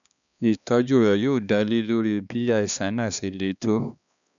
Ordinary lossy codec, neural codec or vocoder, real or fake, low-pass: none; codec, 16 kHz, 2 kbps, X-Codec, HuBERT features, trained on balanced general audio; fake; 7.2 kHz